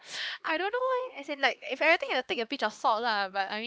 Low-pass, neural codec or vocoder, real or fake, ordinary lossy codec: none; codec, 16 kHz, 2 kbps, X-Codec, HuBERT features, trained on LibriSpeech; fake; none